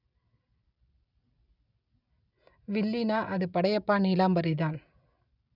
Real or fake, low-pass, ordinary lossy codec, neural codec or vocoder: real; 5.4 kHz; none; none